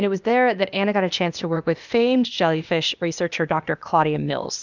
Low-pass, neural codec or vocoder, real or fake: 7.2 kHz; codec, 16 kHz, 0.8 kbps, ZipCodec; fake